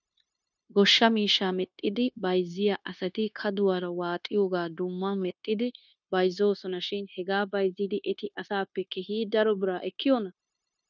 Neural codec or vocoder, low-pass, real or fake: codec, 16 kHz, 0.9 kbps, LongCat-Audio-Codec; 7.2 kHz; fake